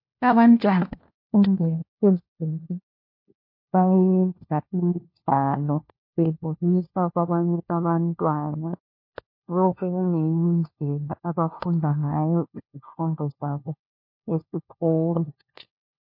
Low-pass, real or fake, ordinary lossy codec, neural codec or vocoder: 5.4 kHz; fake; MP3, 32 kbps; codec, 16 kHz, 1 kbps, FunCodec, trained on LibriTTS, 50 frames a second